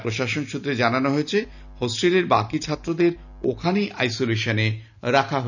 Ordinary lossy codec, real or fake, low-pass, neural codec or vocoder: none; real; 7.2 kHz; none